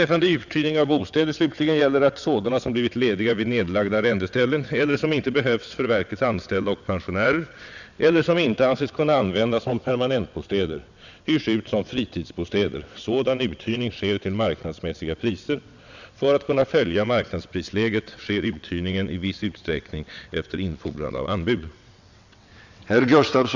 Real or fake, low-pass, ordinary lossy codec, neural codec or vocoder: fake; 7.2 kHz; none; vocoder, 22.05 kHz, 80 mel bands, WaveNeXt